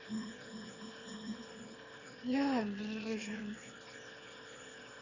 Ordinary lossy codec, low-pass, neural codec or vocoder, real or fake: none; 7.2 kHz; autoencoder, 22.05 kHz, a latent of 192 numbers a frame, VITS, trained on one speaker; fake